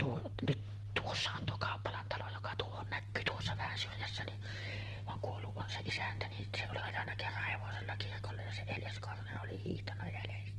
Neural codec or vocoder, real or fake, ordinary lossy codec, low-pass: none; real; Opus, 16 kbps; 10.8 kHz